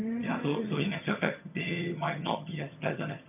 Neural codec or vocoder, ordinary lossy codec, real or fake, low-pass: vocoder, 22.05 kHz, 80 mel bands, HiFi-GAN; none; fake; 3.6 kHz